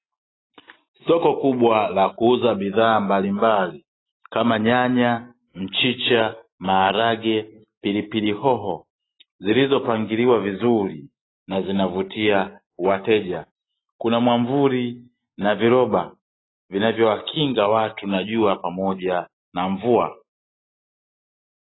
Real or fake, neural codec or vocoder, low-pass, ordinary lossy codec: real; none; 7.2 kHz; AAC, 16 kbps